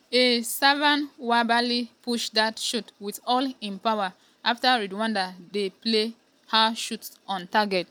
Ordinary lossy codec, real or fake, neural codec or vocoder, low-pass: none; real; none; none